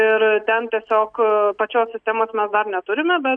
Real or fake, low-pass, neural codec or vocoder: real; 9.9 kHz; none